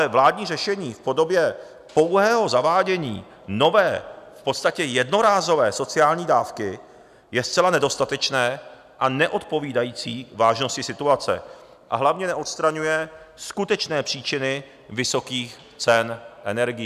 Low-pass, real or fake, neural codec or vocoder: 14.4 kHz; real; none